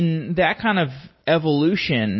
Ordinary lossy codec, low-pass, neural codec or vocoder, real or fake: MP3, 24 kbps; 7.2 kHz; none; real